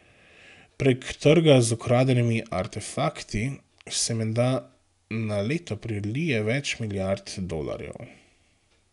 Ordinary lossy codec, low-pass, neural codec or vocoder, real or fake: none; 10.8 kHz; none; real